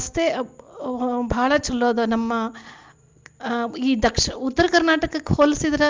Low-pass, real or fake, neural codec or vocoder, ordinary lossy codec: 7.2 kHz; real; none; Opus, 32 kbps